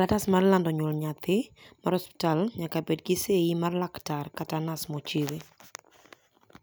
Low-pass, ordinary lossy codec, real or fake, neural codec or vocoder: none; none; real; none